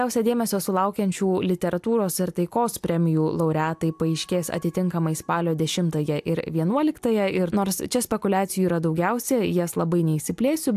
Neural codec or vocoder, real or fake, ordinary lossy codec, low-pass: none; real; AAC, 96 kbps; 14.4 kHz